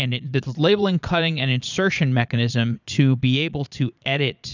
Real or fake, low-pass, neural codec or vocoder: fake; 7.2 kHz; vocoder, 22.05 kHz, 80 mel bands, Vocos